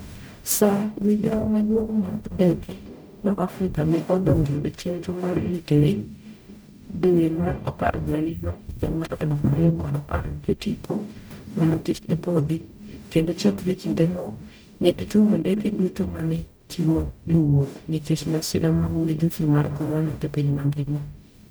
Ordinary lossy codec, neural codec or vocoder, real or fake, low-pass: none; codec, 44.1 kHz, 0.9 kbps, DAC; fake; none